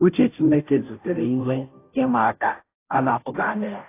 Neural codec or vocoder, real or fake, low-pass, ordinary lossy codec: codec, 16 kHz, 0.5 kbps, FunCodec, trained on Chinese and English, 25 frames a second; fake; 3.6 kHz; AAC, 24 kbps